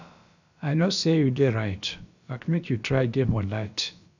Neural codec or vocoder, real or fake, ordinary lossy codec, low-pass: codec, 16 kHz, about 1 kbps, DyCAST, with the encoder's durations; fake; none; 7.2 kHz